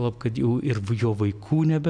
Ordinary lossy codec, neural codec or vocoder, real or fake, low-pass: MP3, 96 kbps; none; real; 9.9 kHz